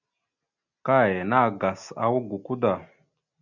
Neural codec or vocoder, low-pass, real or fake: none; 7.2 kHz; real